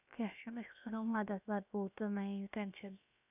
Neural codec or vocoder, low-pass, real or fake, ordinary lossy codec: codec, 16 kHz, about 1 kbps, DyCAST, with the encoder's durations; 3.6 kHz; fake; none